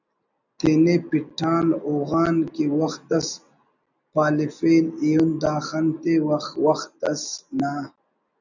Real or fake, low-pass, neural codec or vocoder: real; 7.2 kHz; none